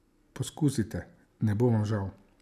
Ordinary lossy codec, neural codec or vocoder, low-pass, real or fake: none; none; 14.4 kHz; real